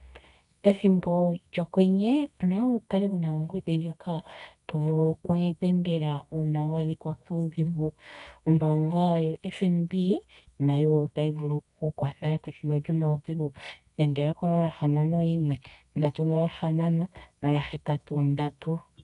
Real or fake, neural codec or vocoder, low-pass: fake; codec, 24 kHz, 0.9 kbps, WavTokenizer, medium music audio release; 10.8 kHz